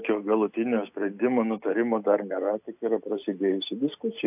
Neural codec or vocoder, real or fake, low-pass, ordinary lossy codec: none; real; 3.6 kHz; AAC, 32 kbps